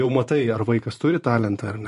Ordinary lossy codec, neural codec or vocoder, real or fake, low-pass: MP3, 48 kbps; vocoder, 44.1 kHz, 128 mel bands every 512 samples, BigVGAN v2; fake; 14.4 kHz